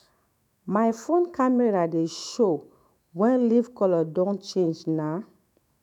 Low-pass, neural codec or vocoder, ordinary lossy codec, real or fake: 19.8 kHz; autoencoder, 48 kHz, 128 numbers a frame, DAC-VAE, trained on Japanese speech; none; fake